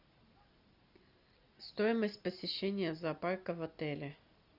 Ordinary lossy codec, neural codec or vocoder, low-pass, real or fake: Opus, 64 kbps; none; 5.4 kHz; real